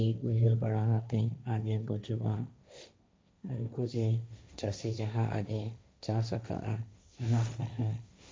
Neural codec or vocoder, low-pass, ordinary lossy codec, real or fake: codec, 16 kHz, 1.1 kbps, Voila-Tokenizer; none; none; fake